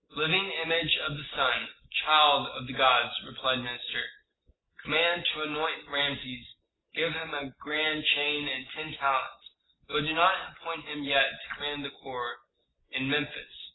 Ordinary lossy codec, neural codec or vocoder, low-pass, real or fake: AAC, 16 kbps; none; 7.2 kHz; real